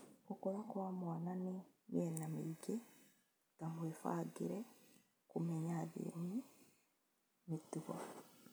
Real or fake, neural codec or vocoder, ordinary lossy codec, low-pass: real; none; none; none